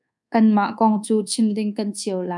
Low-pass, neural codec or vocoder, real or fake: 10.8 kHz; codec, 24 kHz, 1.2 kbps, DualCodec; fake